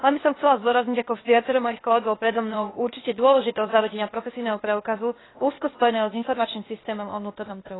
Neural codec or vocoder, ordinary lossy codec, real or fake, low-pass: codec, 16 kHz, 0.8 kbps, ZipCodec; AAC, 16 kbps; fake; 7.2 kHz